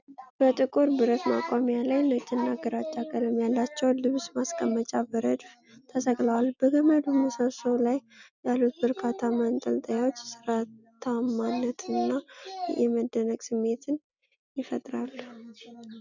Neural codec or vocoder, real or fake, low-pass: none; real; 7.2 kHz